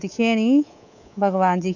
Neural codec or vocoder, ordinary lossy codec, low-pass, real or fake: codec, 24 kHz, 3.1 kbps, DualCodec; none; 7.2 kHz; fake